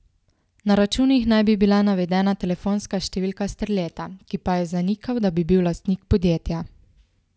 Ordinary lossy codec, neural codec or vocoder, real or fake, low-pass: none; none; real; none